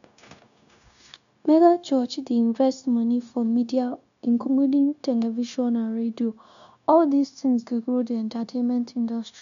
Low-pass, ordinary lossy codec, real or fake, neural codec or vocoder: 7.2 kHz; none; fake; codec, 16 kHz, 0.9 kbps, LongCat-Audio-Codec